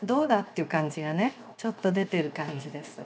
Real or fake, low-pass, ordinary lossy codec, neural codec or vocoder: fake; none; none; codec, 16 kHz, 0.7 kbps, FocalCodec